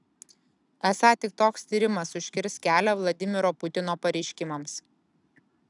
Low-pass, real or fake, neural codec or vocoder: 10.8 kHz; real; none